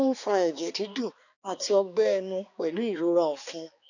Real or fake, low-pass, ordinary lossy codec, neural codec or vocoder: fake; 7.2 kHz; none; codec, 16 kHz, 2 kbps, X-Codec, HuBERT features, trained on balanced general audio